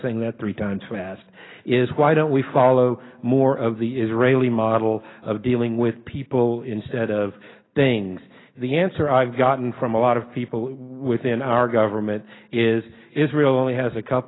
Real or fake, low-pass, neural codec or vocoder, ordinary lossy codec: real; 7.2 kHz; none; AAC, 16 kbps